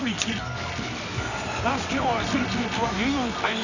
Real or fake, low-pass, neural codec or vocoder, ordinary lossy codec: fake; 7.2 kHz; codec, 24 kHz, 0.9 kbps, WavTokenizer, medium music audio release; none